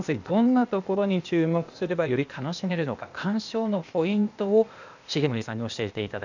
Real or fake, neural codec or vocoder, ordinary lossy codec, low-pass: fake; codec, 16 kHz, 0.8 kbps, ZipCodec; none; 7.2 kHz